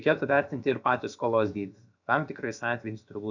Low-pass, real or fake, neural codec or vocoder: 7.2 kHz; fake; codec, 16 kHz, about 1 kbps, DyCAST, with the encoder's durations